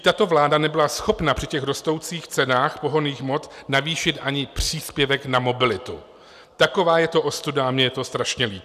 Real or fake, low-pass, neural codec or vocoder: real; 14.4 kHz; none